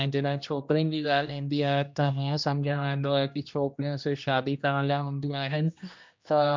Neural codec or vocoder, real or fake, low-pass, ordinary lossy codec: codec, 16 kHz, 1 kbps, X-Codec, HuBERT features, trained on general audio; fake; 7.2 kHz; MP3, 64 kbps